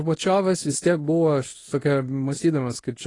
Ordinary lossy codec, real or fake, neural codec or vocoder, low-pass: AAC, 32 kbps; fake; codec, 24 kHz, 0.9 kbps, WavTokenizer, medium speech release version 1; 10.8 kHz